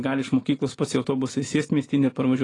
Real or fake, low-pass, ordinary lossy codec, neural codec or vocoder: real; 10.8 kHz; AAC, 32 kbps; none